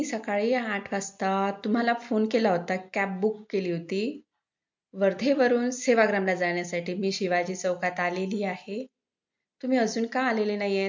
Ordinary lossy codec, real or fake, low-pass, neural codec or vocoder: MP3, 48 kbps; real; 7.2 kHz; none